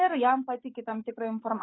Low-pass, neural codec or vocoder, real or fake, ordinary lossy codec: 7.2 kHz; autoencoder, 48 kHz, 128 numbers a frame, DAC-VAE, trained on Japanese speech; fake; AAC, 16 kbps